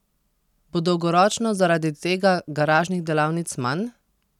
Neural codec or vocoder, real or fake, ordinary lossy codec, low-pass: none; real; none; 19.8 kHz